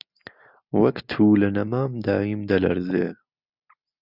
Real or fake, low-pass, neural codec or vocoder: real; 5.4 kHz; none